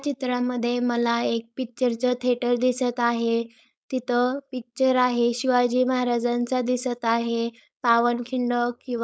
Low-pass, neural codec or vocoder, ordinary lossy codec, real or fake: none; codec, 16 kHz, 4.8 kbps, FACodec; none; fake